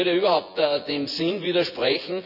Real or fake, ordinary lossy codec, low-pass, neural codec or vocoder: fake; none; 5.4 kHz; vocoder, 24 kHz, 100 mel bands, Vocos